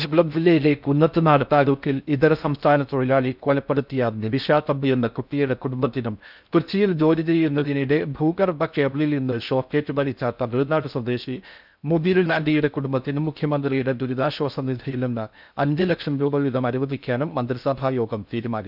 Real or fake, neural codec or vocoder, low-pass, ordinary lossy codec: fake; codec, 16 kHz in and 24 kHz out, 0.6 kbps, FocalCodec, streaming, 4096 codes; 5.4 kHz; none